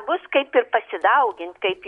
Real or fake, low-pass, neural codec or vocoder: real; 10.8 kHz; none